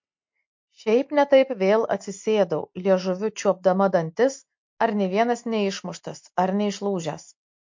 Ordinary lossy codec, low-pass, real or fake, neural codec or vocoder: MP3, 48 kbps; 7.2 kHz; real; none